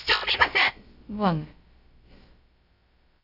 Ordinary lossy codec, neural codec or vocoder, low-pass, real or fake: AAC, 48 kbps; codec, 16 kHz, about 1 kbps, DyCAST, with the encoder's durations; 5.4 kHz; fake